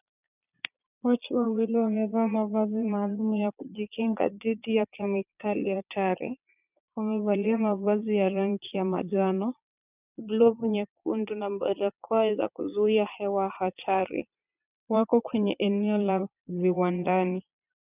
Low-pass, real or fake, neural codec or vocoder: 3.6 kHz; fake; vocoder, 22.05 kHz, 80 mel bands, Vocos